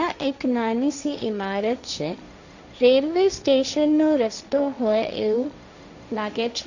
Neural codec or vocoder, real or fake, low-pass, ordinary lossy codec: codec, 16 kHz, 1.1 kbps, Voila-Tokenizer; fake; 7.2 kHz; none